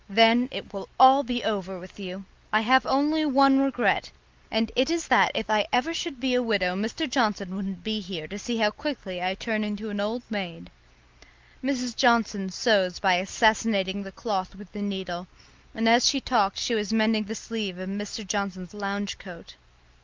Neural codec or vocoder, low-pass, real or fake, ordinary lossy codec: none; 7.2 kHz; real; Opus, 32 kbps